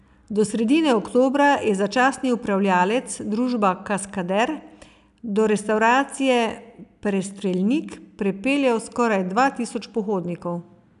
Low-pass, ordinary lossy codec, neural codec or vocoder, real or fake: 10.8 kHz; none; none; real